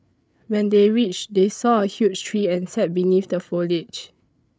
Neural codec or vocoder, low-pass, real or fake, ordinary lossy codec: codec, 16 kHz, 8 kbps, FreqCodec, larger model; none; fake; none